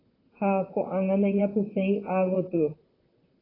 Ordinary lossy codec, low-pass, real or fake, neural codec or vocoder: AAC, 24 kbps; 5.4 kHz; fake; vocoder, 44.1 kHz, 128 mel bands, Pupu-Vocoder